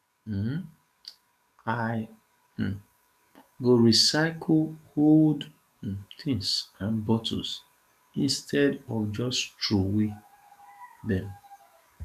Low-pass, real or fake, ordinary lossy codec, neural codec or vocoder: 14.4 kHz; fake; none; codec, 44.1 kHz, 7.8 kbps, DAC